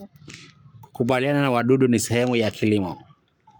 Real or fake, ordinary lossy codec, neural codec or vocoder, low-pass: fake; none; codec, 44.1 kHz, 7.8 kbps, Pupu-Codec; 19.8 kHz